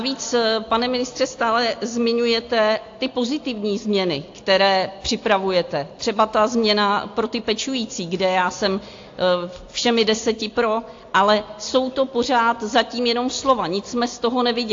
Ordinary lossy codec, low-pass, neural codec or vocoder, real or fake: AAC, 48 kbps; 7.2 kHz; none; real